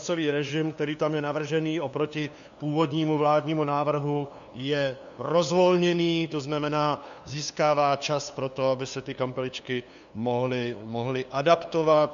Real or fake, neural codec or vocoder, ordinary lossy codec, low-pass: fake; codec, 16 kHz, 2 kbps, FunCodec, trained on LibriTTS, 25 frames a second; MP3, 64 kbps; 7.2 kHz